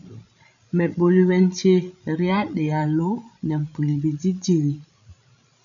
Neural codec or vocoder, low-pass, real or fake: codec, 16 kHz, 16 kbps, FreqCodec, larger model; 7.2 kHz; fake